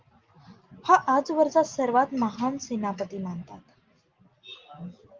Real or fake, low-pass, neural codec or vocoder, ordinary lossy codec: real; 7.2 kHz; none; Opus, 32 kbps